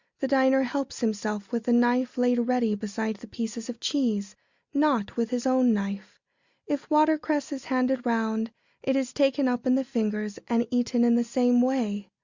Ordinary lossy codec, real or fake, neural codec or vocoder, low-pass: Opus, 64 kbps; real; none; 7.2 kHz